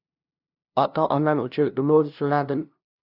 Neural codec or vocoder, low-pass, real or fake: codec, 16 kHz, 0.5 kbps, FunCodec, trained on LibriTTS, 25 frames a second; 5.4 kHz; fake